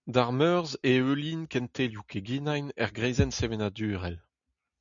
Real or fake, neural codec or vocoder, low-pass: real; none; 7.2 kHz